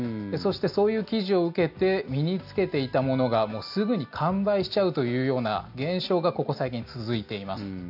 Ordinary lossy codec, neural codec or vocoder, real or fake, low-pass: AAC, 48 kbps; none; real; 5.4 kHz